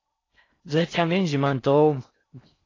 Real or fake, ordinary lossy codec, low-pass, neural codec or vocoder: fake; AAC, 32 kbps; 7.2 kHz; codec, 16 kHz in and 24 kHz out, 0.6 kbps, FocalCodec, streaming, 4096 codes